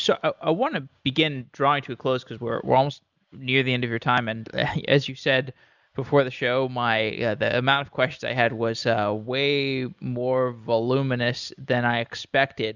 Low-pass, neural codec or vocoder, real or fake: 7.2 kHz; none; real